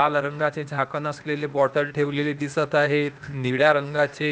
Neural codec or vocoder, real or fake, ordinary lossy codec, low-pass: codec, 16 kHz, 0.8 kbps, ZipCodec; fake; none; none